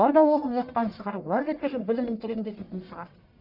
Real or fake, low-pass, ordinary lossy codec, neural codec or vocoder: fake; 5.4 kHz; none; codec, 44.1 kHz, 1.7 kbps, Pupu-Codec